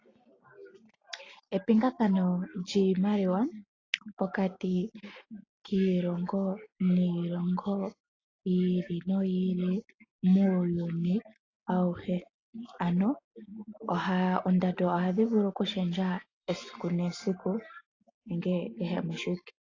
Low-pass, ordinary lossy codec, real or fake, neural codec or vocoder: 7.2 kHz; AAC, 32 kbps; real; none